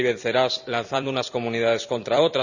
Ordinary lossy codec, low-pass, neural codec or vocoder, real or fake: none; 7.2 kHz; vocoder, 44.1 kHz, 128 mel bands every 256 samples, BigVGAN v2; fake